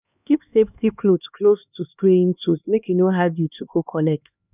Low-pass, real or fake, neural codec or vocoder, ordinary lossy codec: 3.6 kHz; fake; codec, 16 kHz, 2 kbps, X-Codec, HuBERT features, trained on LibriSpeech; none